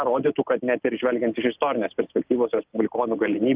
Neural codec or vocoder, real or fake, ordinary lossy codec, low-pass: none; real; Opus, 24 kbps; 3.6 kHz